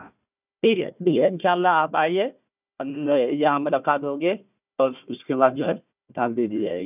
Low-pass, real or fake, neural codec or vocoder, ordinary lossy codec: 3.6 kHz; fake; codec, 16 kHz, 1 kbps, FunCodec, trained on Chinese and English, 50 frames a second; none